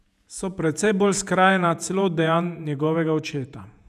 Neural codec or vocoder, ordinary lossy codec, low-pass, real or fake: vocoder, 48 kHz, 128 mel bands, Vocos; none; 14.4 kHz; fake